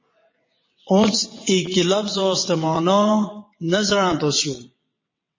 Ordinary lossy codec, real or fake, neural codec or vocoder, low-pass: MP3, 32 kbps; fake; vocoder, 22.05 kHz, 80 mel bands, WaveNeXt; 7.2 kHz